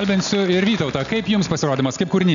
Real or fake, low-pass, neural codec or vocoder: real; 7.2 kHz; none